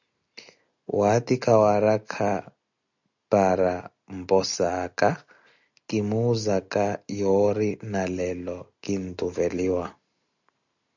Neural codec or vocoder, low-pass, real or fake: none; 7.2 kHz; real